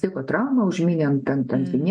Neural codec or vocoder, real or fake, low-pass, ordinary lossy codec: none; real; 9.9 kHz; MP3, 48 kbps